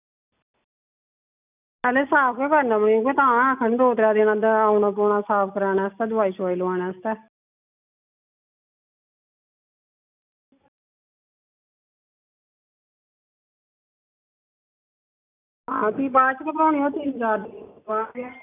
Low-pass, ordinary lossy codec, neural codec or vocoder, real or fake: 3.6 kHz; none; none; real